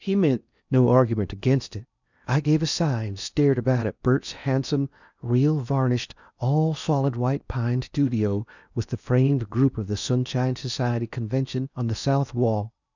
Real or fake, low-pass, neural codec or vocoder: fake; 7.2 kHz; codec, 16 kHz in and 24 kHz out, 0.8 kbps, FocalCodec, streaming, 65536 codes